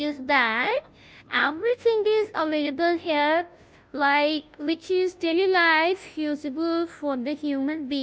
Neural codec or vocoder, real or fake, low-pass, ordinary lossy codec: codec, 16 kHz, 0.5 kbps, FunCodec, trained on Chinese and English, 25 frames a second; fake; none; none